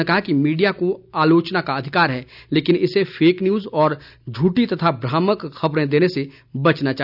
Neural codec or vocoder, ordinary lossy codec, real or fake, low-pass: none; none; real; 5.4 kHz